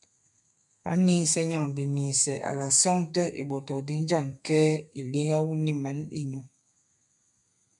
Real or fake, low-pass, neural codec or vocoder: fake; 10.8 kHz; codec, 32 kHz, 1.9 kbps, SNAC